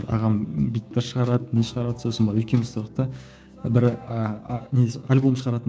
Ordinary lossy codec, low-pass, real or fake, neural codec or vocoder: none; none; fake; codec, 16 kHz, 6 kbps, DAC